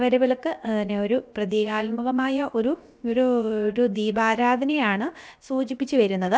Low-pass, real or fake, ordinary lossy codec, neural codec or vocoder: none; fake; none; codec, 16 kHz, about 1 kbps, DyCAST, with the encoder's durations